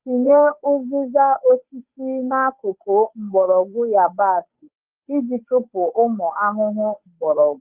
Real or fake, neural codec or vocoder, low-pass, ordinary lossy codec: fake; codec, 16 kHz, 8 kbps, FunCodec, trained on Chinese and English, 25 frames a second; 3.6 kHz; Opus, 32 kbps